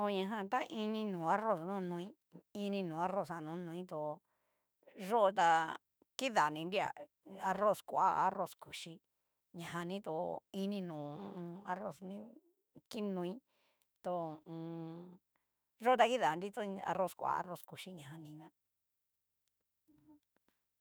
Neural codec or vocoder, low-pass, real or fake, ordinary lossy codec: autoencoder, 48 kHz, 32 numbers a frame, DAC-VAE, trained on Japanese speech; none; fake; none